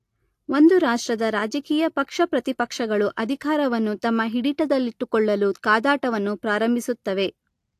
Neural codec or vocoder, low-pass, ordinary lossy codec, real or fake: none; 14.4 kHz; AAC, 64 kbps; real